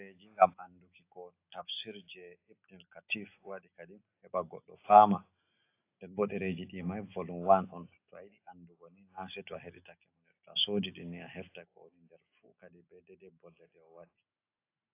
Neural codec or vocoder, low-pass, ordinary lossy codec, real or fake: none; 3.6 kHz; AAC, 24 kbps; real